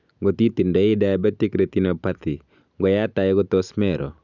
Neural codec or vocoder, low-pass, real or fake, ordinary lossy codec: none; 7.2 kHz; real; none